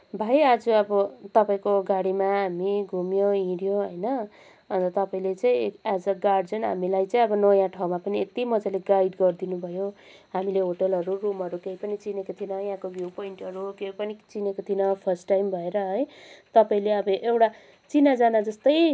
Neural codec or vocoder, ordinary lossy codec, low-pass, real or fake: none; none; none; real